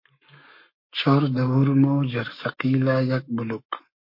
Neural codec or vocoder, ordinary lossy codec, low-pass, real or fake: codec, 44.1 kHz, 7.8 kbps, Pupu-Codec; MP3, 32 kbps; 5.4 kHz; fake